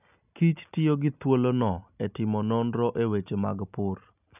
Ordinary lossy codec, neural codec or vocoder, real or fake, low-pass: none; none; real; 3.6 kHz